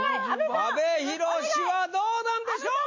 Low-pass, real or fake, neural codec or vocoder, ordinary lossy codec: 7.2 kHz; real; none; MP3, 32 kbps